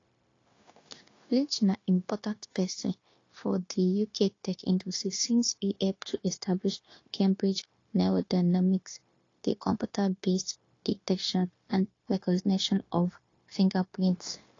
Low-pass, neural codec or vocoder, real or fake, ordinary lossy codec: 7.2 kHz; codec, 16 kHz, 0.9 kbps, LongCat-Audio-Codec; fake; AAC, 32 kbps